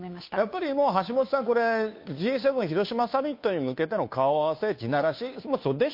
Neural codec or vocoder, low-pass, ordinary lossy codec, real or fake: codec, 16 kHz, 2 kbps, FunCodec, trained on Chinese and English, 25 frames a second; 5.4 kHz; MP3, 32 kbps; fake